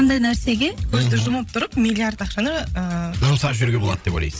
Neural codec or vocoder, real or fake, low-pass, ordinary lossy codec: codec, 16 kHz, 16 kbps, FreqCodec, larger model; fake; none; none